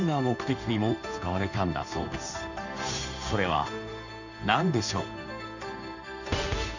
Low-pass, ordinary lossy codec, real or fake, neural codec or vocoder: 7.2 kHz; none; fake; codec, 16 kHz in and 24 kHz out, 1 kbps, XY-Tokenizer